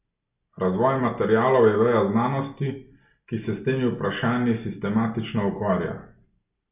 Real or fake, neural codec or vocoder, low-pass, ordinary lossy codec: real; none; 3.6 kHz; none